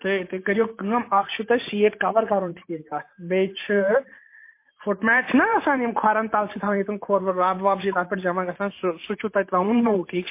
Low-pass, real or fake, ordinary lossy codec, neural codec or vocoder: 3.6 kHz; fake; MP3, 24 kbps; vocoder, 44.1 kHz, 128 mel bands every 512 samples, BigVGAN v2